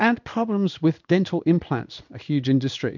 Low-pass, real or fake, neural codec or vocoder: 7.2 kHz; fake; codec, 16 kHz in and 24 kHz out, 1 kbps, XY-Tokenizer